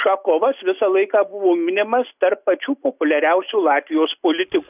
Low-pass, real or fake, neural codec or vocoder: 3.6 kHz; real; none